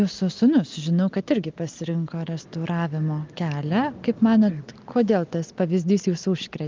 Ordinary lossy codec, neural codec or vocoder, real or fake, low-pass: Opus, 32 kbps; none; real; 7.2 kHz